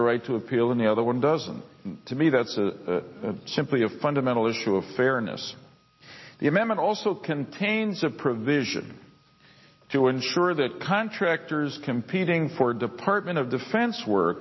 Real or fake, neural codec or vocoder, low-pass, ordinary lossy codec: real; none; 7.2 kHz; MP3, 24 kbps